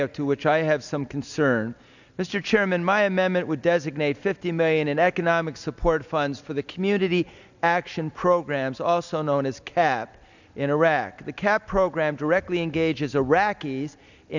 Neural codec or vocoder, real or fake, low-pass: none; real; 7.2 kHz